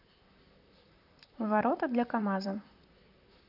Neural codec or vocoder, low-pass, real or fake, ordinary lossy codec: vocoder, 44.1 kHz, 128 mel bands, Pupu-Vocoder; 5.4 kHz; fake; none